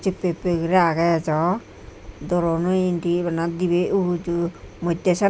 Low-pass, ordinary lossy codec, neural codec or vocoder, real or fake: none; none; none; real